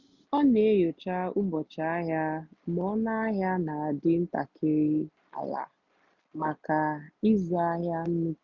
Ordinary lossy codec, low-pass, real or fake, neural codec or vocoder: none; 7.2 kHz; real; none